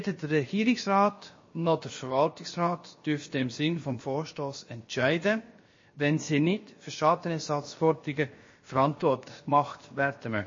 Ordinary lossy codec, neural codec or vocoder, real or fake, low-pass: MP3, 32 kbps; codec, 16 kHz, about 1 kbps, DyCAST, with the encoder's durations; fake; 7.2 kHz